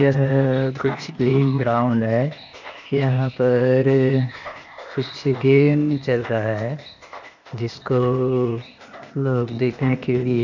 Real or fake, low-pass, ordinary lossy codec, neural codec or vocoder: fake; 7.2 kHz; none; codec, 16 kHz, 0.8 kbps, ZipCodec